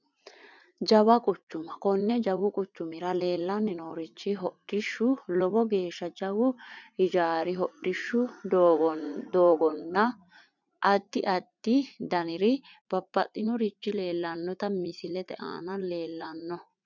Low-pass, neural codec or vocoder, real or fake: 7.2 kHz; vocoder, 44.1 kHz, 80 mel bands, Vocos; fake